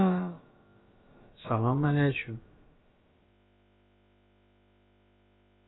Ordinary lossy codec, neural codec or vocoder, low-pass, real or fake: AAC, 16 kbps; codec, 16 kHz, about 1 kbps, DyCAST, with the encoder's durations; 7.2 kHz; fake